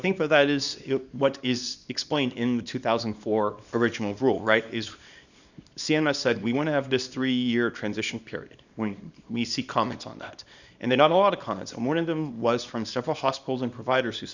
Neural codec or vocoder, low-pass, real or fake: codec, 24 kHz, 0.9 kbps, WavTokenizer, small release; 7.2 kHz; fake